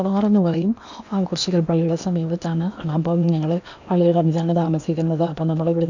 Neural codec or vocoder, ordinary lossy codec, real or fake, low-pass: codec, 16 kHz in and 24 kHz out, 0.8 kbps, FocalCodec, streaming, 65536 codes; none; fake; 7.2 kHz